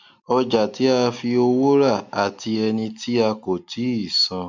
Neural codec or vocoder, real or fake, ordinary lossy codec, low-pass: none; real; MP3, 64 kbps; 7.2 kHz